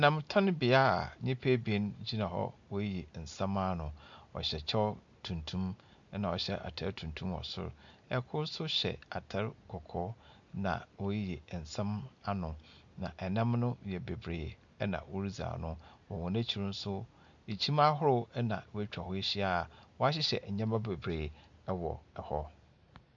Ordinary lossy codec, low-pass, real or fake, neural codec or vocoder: MP3, 64 kbps; 7.2 kHz; real; none